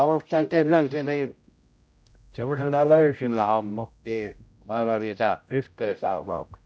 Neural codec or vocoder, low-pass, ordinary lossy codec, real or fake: codec, 16 kHz, 0.5 kbps, X-Codec, HuBERT features, trained on general audio; none; none; fake